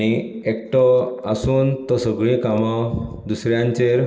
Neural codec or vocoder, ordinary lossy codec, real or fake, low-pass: none; none; real; none